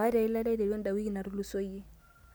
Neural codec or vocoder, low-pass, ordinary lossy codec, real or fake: none; none; none; real